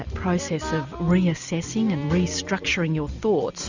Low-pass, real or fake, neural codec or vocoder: 7.2 kHz; real; none